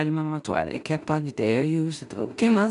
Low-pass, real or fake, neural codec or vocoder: 10.8 kHz; fake; codec, 16 kHz in and 24 kHz out, 0.4 kbps, LongCat-Audio-Codec, two codebook decoder